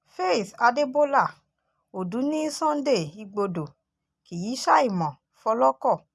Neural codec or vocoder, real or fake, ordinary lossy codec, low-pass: none; real; none; none